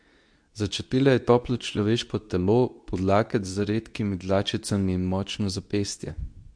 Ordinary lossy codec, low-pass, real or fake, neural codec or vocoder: MP3, 64 kbps; 9.9 kHz; fake; codec, 24 kHz, 0.9 kbps, WavTokenizer, medium speech release version 2